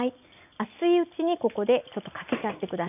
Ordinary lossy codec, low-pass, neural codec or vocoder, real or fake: none; 3.6 kHz; none; real